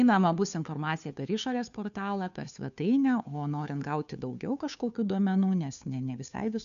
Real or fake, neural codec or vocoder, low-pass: fake; codec, 16 kHz, 2 kbps, FunCodec, trained on Chinese and English, 25 frames a second; 7.2 kHz